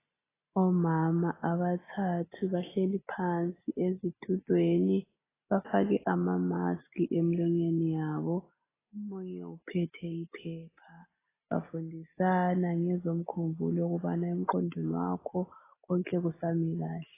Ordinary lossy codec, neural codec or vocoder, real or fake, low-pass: AAC, 16 kbps; none; real; 3.6 kHz